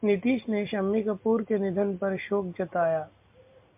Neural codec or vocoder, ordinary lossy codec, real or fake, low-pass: none; MP3, 32 kbps; real; 3.6 kHz